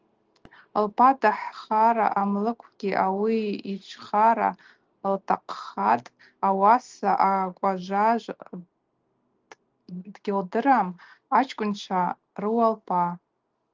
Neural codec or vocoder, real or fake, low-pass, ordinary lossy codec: none; real; 7.2 kHz; Opus, 24 kbps